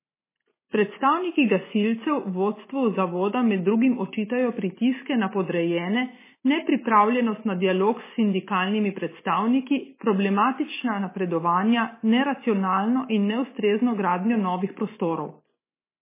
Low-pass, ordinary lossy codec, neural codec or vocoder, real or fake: 3.6 kHz; MP3, 16 kbps; none; real